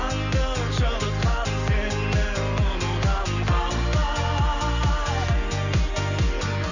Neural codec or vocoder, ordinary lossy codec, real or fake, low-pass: none; none; real; 7.2 kHz